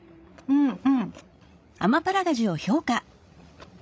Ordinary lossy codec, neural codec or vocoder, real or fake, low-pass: none; codec, 16 kHz, 16 kbps, FreqCodec, larger model; fake; none